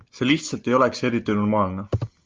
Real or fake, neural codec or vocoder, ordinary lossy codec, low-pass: real; none; Opus, 24 kbps; 7.2 kHz